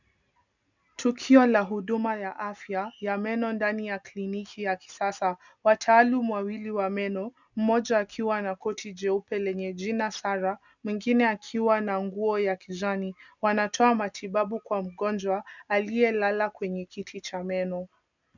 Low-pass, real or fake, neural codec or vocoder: 7.2 kHz; real; none